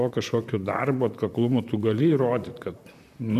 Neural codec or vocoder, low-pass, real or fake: vocoder, 44.1 kHz, 128 mel bands, Pupu-Vocoder; 14.4 kHz; fake